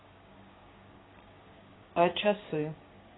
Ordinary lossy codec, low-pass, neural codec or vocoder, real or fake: AAC, 16 kbps; 7.2 kHz; codec, 16 kHz, 6 kbps, DAC; fake